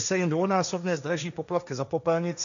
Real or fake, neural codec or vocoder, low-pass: fake; codec, 16 kHz, 1.1 kbps, Voila-Tokenizer; 7.2 kHz